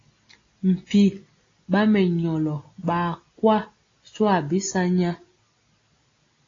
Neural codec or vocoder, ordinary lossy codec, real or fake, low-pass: none; AAC, 32 kbps; real; 7.2 kHz